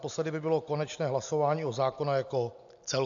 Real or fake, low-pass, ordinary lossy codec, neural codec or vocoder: real; 7.2 kHz; AAC, 64 kbps; none